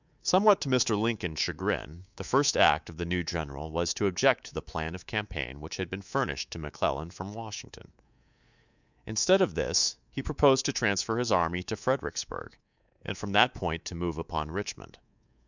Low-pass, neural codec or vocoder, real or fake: 7.2 kHz; codec, 24 kHz, 3.1 kbps, DualCodec; fake